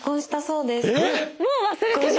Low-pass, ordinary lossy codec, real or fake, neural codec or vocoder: none; none; real; none